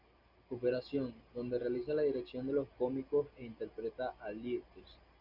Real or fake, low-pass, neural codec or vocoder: real; 5.4 kHz; none